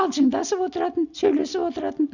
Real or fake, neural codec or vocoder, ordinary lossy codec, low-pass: real; none; none; 7.2 kHz